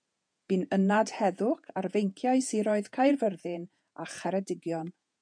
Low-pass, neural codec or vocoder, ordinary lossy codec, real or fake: 9.9 kHz; vocoder, 48 kHz, 128 mel bands, Vocos; MP3, 96 kbps; fake